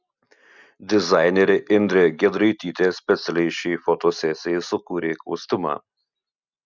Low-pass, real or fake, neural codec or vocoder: 7.2 kHz; real; none